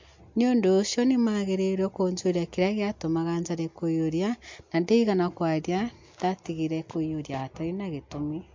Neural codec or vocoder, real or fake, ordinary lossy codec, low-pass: none; real; MP3, 48 kbps; 7.2 kHz